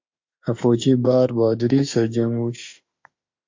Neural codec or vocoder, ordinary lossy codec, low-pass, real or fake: autoencoder, 48 kHz, 32 numbers a frame, DAC-VAE, trained on Japanese speech; MP3, 48 kbps; 7.2 kHz; fake